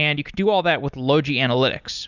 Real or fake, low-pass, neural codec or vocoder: real; 7.2 kHz; none